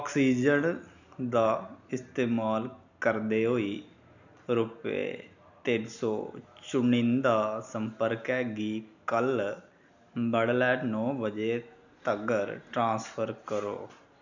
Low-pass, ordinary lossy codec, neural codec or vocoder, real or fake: 7.2 kHz; none; none; real